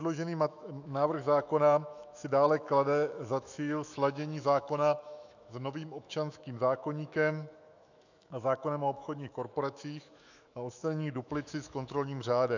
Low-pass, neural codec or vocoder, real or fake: 7.2 kHz; autoencoder, 48 kHz, 128 numbers a frame, DAC-VAE, trained on Japanese speech; fake